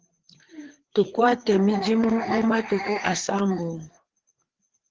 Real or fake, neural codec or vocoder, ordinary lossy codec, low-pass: fake; codec, 16 kHz, 4 kbps, FreqCodec, larger model; Opus, 16 kbps; 7.2 kHz